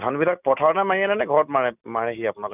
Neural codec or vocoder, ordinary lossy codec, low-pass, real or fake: none; none; 3.6 kHz; real